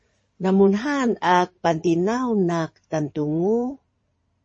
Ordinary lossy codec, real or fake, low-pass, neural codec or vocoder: MP3, 32 kbps; fake; 9.9 kHz; codec, 16 kHz in and 24 kHz out, 2.2 kbps, FireRedTTS-2 codec